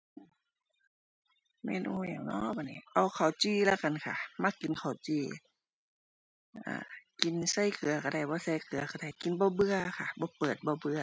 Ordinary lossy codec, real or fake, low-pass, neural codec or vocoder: none; real; none; none